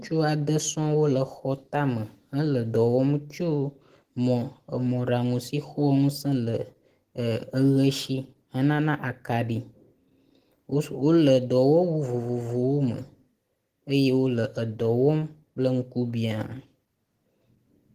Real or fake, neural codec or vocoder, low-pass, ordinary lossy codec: real; none; 14.4 kHz; Opus, 16 kbps